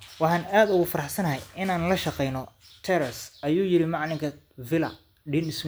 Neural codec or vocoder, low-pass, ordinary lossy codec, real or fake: none; none; none; real